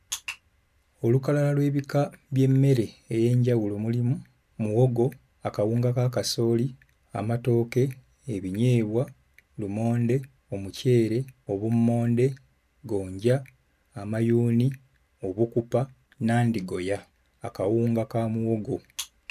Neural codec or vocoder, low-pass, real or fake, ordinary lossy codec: none; 14.4 kHz; real; none